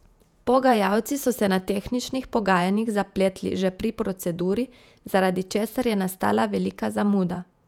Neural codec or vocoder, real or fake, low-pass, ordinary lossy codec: vocoder, 48 kHz, 128 mel bands, Vocos; fake; 19.8 kHz; none